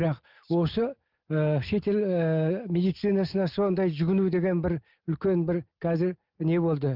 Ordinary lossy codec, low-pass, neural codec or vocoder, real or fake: Opus, 24 kbps; 5.4 kHz; none; real